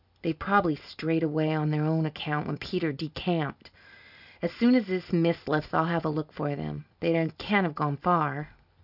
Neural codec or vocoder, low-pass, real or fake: none; 5.4 kHz; real